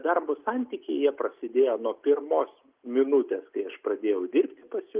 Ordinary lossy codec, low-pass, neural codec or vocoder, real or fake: Opus, 24 kbps; 3.6 kHz; none; real